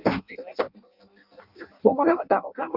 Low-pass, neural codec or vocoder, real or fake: 5.4 kHz; codec, 16 kHz in and 24 kHz out, 0.6 kbps, FireRedTTS-2 codec; fake